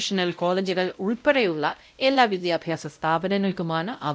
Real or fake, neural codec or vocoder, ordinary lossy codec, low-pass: fake; codec, 16 kHz, 0.5 kbps, X-Codec, WavLM features, trained on Multilingual LibriSpeech; none; none